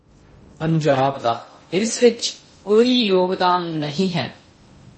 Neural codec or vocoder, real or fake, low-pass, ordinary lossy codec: codec, 16 kHz in and 24 kHz out, 0.6 kbps, FocalCodec, streaming, 2048 codes; fake; 10.8 kHz; MP3, 32 kbps